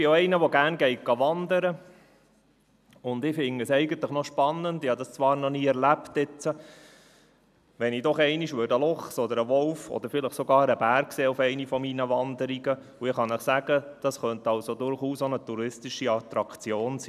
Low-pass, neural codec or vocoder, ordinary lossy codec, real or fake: 14.4 kHz; none; none; real